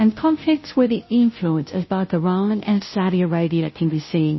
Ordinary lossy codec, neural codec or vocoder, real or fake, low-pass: MP3, 24 kbps; codec, 16 kHz, 0.5 kbps, FunCodec, trained on Chinese and English, 25 frames a second; fake; 7.2 kHz